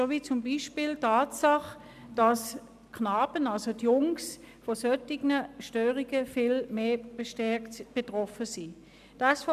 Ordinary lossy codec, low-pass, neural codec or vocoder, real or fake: AAC, 96 kbps; 14.4 kHz; none; real